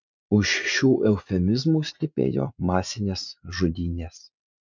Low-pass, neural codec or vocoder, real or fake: 7.2 kHz; vocoder, 44.1 kHz, 80 mel bands, Vocos; fake